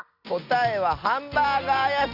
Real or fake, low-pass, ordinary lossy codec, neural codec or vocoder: real; 5.4 kHz; Opus, 64 kbps; none